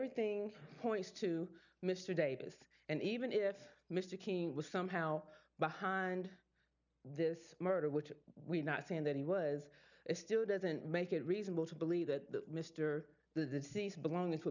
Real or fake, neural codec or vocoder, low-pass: real; none; 7.2 kHz